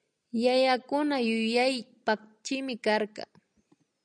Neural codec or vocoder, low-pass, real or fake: none; 9.9 kHz; real